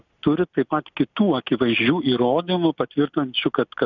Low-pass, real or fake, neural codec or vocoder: 7.2 kHz; real; none